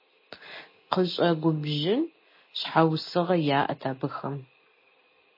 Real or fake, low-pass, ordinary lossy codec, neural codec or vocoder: real; 5.4 kHz; MP3, 24 kbps; none